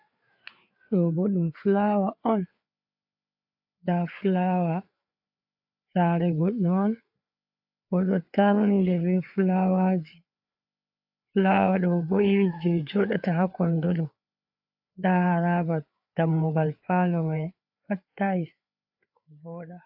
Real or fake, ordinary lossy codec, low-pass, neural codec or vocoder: fake; AAC, 48 kbps; 5.4 kHz; codec, 16 kHz, 4 kbps, FreqCodec, larger model